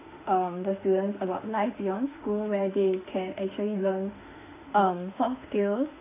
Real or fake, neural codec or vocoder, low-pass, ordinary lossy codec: fake; autoencoder, 48 kHz, 32 numbers a frame, DAC-VAE, trained on Japanese speech; 3.6 kHz; AAC, 24 kbps